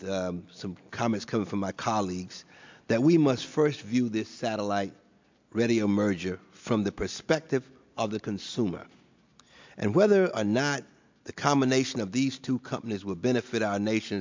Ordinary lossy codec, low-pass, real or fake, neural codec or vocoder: MP3, 64 kbps; 7.2 kHz; real; none